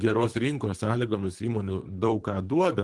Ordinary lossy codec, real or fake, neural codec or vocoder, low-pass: Opus, 24 kbps; fake; codec, 24 kHz, 3 kbps, HILCodec; 10.8 kHz